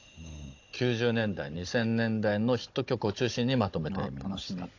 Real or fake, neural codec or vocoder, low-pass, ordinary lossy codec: fake; codec, 16 kHz, 16 kbps, FunCodec, trained on Chinese and English, 50 frames a second; 7.2 kHz; AAC, 48 kbps